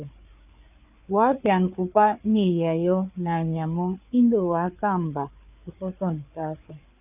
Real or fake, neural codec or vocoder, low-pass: fake; codec, 16 kHz, 4 kbps, FreqCodec, larger model; 3.6 kHz